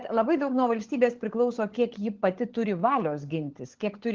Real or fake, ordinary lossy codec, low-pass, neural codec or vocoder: fake; Opus, 16 kbps; 7.2 kHz; codec, 16 kHz, 16 kbps, FunCodec, trained on LibriTTS, 50 frames a second